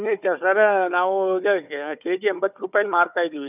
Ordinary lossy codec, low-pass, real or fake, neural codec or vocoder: none; 3.6 kHz; fake; codec, 16 kHz, 4 kbps, FunCodec, trained on Chinese and English, 50 frames a second